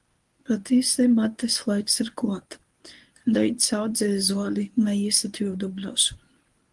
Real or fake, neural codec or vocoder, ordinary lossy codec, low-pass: fake; codec, 24 kHz, 0.9 kbps, WavTokenizer, medium speech release version 1; Opus, 24 kbps; 10.8 kHz